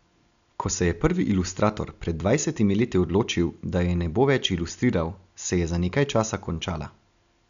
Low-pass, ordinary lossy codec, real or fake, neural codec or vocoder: 7.2 kHz; MP3, 96 kbps; real; none